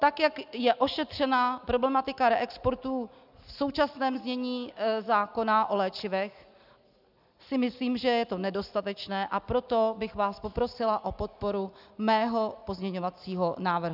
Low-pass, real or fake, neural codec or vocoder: 5.4 kHz; real; none